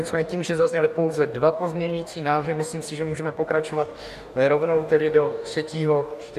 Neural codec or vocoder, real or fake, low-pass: codec, 44.1 kHz, 2.6 kbps, DAC; fake; 14.4 kHz